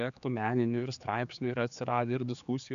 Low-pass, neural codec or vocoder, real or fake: 7.2 kHz; codec, 16 kHz, 4 kbps, X-Codec, HuBERT features, trained on general audio; fake